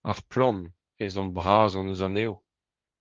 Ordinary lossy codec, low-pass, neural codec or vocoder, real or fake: Opus, 24 kbps; 7.2 kHz; codec, 16 kHz, 1.1 kbps, Voila-Tokenizer; fake